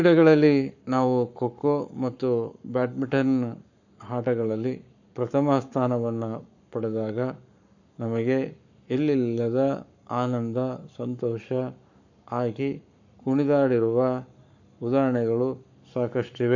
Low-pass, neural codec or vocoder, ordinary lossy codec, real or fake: 7.2 kHz; codec, 44.1 kHz, 7.8 kbps, Pupu-Codec; none; fake